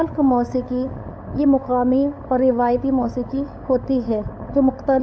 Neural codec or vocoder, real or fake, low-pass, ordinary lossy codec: codec, 16 kHz, 8 kbps, FunCodec, trained on LibriTTS, 25 frames a second; fake; none; none